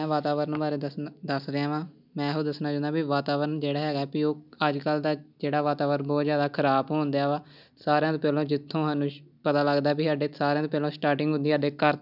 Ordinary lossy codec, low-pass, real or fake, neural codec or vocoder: none; 5.4 kHz; real; none